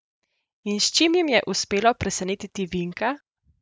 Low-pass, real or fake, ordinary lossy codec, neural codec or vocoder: none; real; none; none